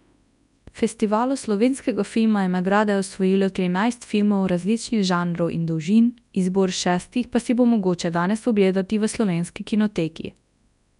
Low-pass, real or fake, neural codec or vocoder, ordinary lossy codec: 10.8 kHz; fake; codec, 24 kHz, 0.9 kbps, WavTokenizer, large speech release; none